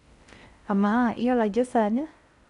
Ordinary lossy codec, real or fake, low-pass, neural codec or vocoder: none; fake; 10.8 kHz; codec, 16 kHz in and 24 kHz out, 0.6 kbps, FocalCodec, streaming, 4096 codes